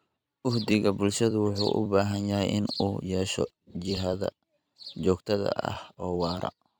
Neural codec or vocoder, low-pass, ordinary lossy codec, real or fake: vocoder, 44.1 kHz, 128 mel bands every 256 samples, BigVGAN v2; none; none; fake